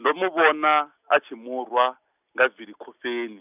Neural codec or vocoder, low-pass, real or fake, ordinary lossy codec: none; 3.6 kHz; real; none